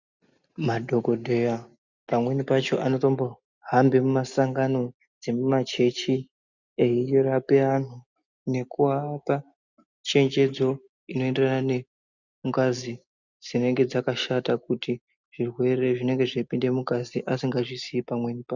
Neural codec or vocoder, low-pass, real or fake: none; 7.2 kHz; real